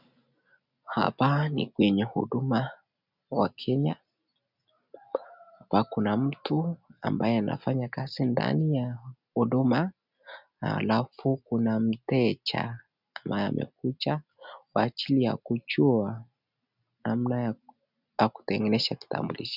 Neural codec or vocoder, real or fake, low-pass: none; real; 5.4 kHz